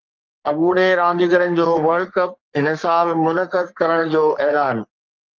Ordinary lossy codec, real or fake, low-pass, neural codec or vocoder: Opus, 32 kbps; fake; 7.2 kHz; codec, 44.1 kHz, 3.4 kbps, Pupu-Codec